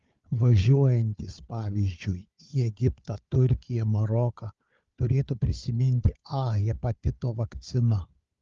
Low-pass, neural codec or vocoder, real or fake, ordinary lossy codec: 7.2 kHz; codec, 16 kHz, 4 kbps, FunCodec, trained on Chinese and English, 50 frames a second; fake; Opus, 32 kbps